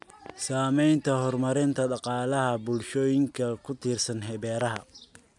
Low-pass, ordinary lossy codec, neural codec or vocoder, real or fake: 10.8 kHz; none; none; real